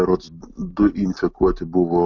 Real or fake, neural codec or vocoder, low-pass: real; none; 7.2 kHz